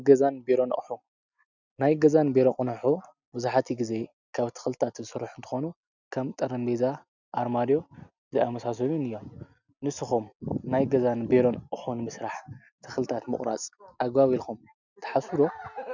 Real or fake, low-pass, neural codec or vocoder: real; 7.2 kHz; none